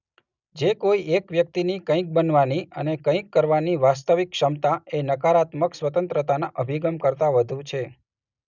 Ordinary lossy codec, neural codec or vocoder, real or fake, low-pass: none; none; real; 7.2 kHz